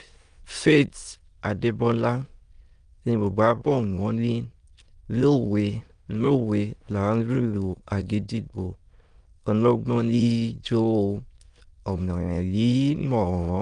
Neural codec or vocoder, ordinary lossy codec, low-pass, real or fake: autoencoder, 22.05 kHz, a latent of 192 numbers a frame, VITS, trained on many speakers; Opus, 32 kbps; 9.9 kHz; fake